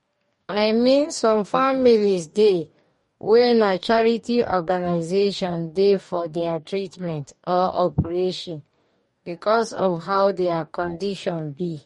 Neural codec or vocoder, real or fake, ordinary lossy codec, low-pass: codec, 44.1 kHz, 2.6 kbps, DAC; fake; MP3, 48 kbps; 19.8 kHz